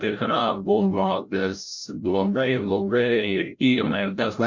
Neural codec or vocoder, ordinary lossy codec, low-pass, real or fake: codec, 16 kHz, 0.5 kbps, FreqCodec, larger model; MP3, 64 kbps; 7.2 kHz; fake